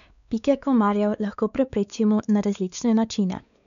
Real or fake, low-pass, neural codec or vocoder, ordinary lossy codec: fake; 7.2 kHz; codec, 16 kHz, 4 kbps, X-Codec, WavLM features, trained on Multilingual LibriSpeech; none